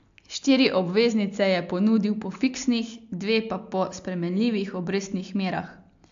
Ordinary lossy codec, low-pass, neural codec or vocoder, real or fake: AAC, 64 kbps; 7.2 kHz; none; real